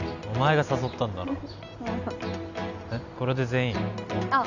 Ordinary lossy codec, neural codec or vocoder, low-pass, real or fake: Opus, 64 kbps; none; 7.2 kHz; real